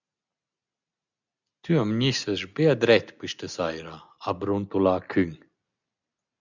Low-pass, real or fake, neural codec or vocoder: 7.2 kHz; real; none